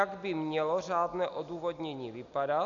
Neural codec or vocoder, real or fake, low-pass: none; real; 7.2 kHz